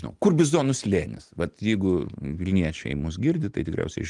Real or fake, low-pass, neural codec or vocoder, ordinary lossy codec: real; 10.8 kHz; none; Opus, 24 kbps